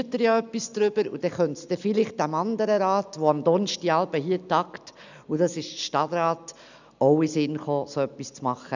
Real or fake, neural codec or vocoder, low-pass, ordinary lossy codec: real; none; 7.2 kHz; none